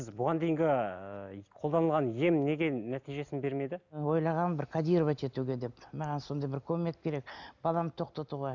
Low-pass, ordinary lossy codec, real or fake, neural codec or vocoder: 7.2 kHz; none; real; none